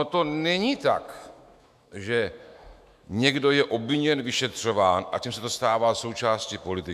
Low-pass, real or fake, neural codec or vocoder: 14.4 kHz; fake; autoencoder, 48 kHz, 128 numbers a frame, DAC-VAE, trained on Japanese speech